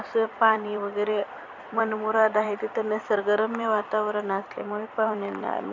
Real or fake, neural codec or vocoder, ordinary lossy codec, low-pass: fake; vocoder, 44.1 kHz, 128 mel bands every 256 samples, BigVGAN v2; MP3, 64 kbps; 7.2 kHz